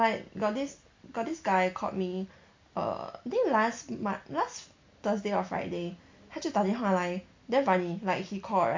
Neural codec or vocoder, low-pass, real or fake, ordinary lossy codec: none; 7.2 kHz; real; MP3, 48 kbps